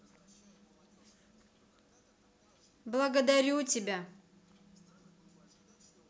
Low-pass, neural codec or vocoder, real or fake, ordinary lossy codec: none; none; real; none